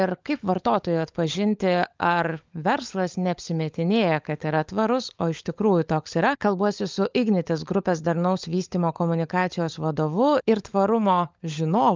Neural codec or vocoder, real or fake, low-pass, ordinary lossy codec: codec, 16 kHz, 16 kbps, FunCodec, trained on LibriTTS, 50 frames a second; fake; 7.2 kHz; Opus, 24 kbps